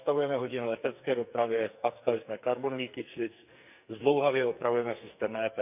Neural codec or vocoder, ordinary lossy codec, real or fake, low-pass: codec, 44.1 kHz, 2.6 kbps, SNAC; none; fake; 3.6 kHz